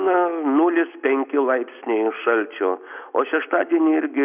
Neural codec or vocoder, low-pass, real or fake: none; 3.6 kHz; real